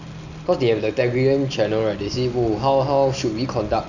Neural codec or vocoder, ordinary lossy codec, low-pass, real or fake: none; none; 7.2 kHz; real